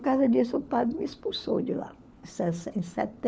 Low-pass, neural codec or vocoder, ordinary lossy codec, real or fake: none; codec, 16 kHz, 4 kbps, FunCodec, trained on LibriTTS, 50 frames a second; none; fake